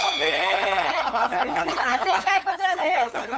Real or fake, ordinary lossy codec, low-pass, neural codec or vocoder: fake; none; none; codec, 16 kHz, 8 kbps, FunCodec, trained on LibriTTS, 25 frames a second